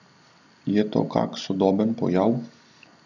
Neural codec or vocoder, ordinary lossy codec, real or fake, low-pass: none; none; real; 7.2 kHz